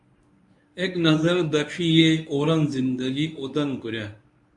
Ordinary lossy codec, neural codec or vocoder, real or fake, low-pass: MP3, 64 kbps; codec, 24 kHz, 0.9 kbps, WavTokenizer, medium speech release version 1; fake; 10.8 kHz